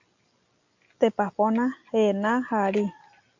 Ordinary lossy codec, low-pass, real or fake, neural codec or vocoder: AAC, 48 kbps; 7.2 kHz; real; none